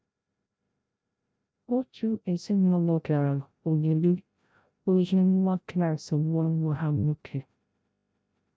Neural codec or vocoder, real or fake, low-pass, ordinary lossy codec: codec, 16 kHz, 0.5 kbps, FreqCodec, larger model; fake; none; none